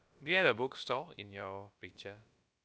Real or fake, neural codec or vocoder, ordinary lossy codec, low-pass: fake; codec, 16 kHz, about 1 kbps, DyCAST, with the encoder's durations; none; none